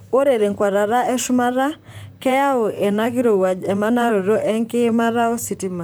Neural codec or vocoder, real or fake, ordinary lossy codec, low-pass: vocoder, 44.1 kHz, 128 mel bands, Pupu-Vocoder; fake; none; none